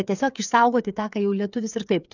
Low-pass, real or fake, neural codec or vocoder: 7.2 kHz; fake; codec, 16 kHz, 8 kbps, FreqCodec, smaller model